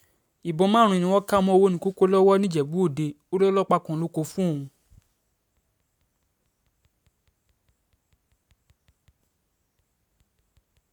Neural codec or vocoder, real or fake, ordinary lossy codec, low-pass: none; real; none; 19.8 kHz